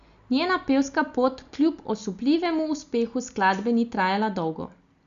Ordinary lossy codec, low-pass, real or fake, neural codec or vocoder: Opus, 64 kbps; 7.2 kHz; real; none